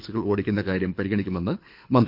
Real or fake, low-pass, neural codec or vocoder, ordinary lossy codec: fake; 5.4 kHz; codec, 24 kHz, 6 kbps, HILCodec; none